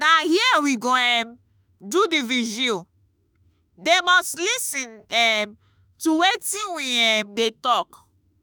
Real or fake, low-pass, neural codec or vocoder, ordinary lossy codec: fake; none; autoencoder, 48 kHz, 32 numbers a frame, DAC-VAE, trained on Japanese speech; none